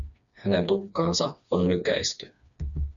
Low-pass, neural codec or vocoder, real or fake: 7.2 kHz; codec, 16 kHz, 2 kbps, FreqCodec, smaller model; fake